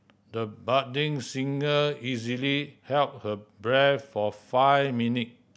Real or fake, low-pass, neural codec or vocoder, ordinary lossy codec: real; none; none; none